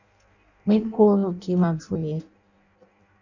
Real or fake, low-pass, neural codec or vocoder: fake; 7.2 kHz; codec, 16 kHz in and 24 kHz out, 0.6 kbps, FireRedTTS-2 codec